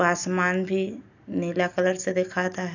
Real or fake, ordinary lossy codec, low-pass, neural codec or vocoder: real; none; 7.2 kHz; none